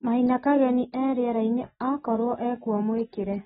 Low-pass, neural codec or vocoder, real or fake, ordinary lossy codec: 19.8 kHz; none; real; AAC, 16 kbps